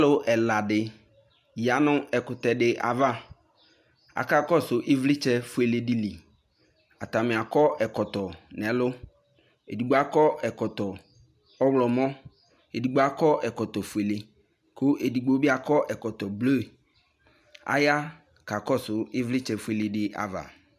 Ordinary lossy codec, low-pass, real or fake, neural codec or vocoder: MP3, 96 kbps; 14.4 kHz; real; none